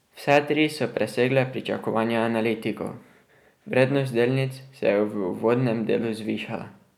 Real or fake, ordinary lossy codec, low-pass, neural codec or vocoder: real; none; 19.8 kHz; none